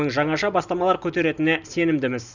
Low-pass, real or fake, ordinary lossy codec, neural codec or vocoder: 7.2 kHz; real; none; none